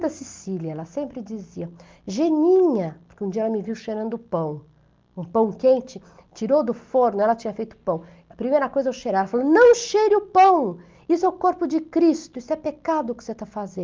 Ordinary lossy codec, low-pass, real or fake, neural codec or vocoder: Opus, 32 kbps; 7.2 kHz; real; none